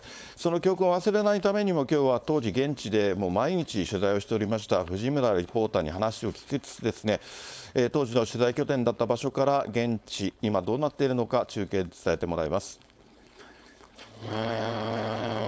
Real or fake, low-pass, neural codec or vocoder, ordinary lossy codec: fake; none; codec, 16 kHz, 4.8 kbps, FACodec; none